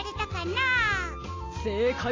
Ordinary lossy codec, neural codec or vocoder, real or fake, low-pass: AAC, 32 kbps; none; real; 7.2 kHz